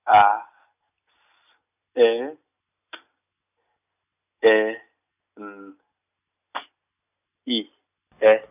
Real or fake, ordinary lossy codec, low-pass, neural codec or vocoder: real; none; 3.6 kHz; none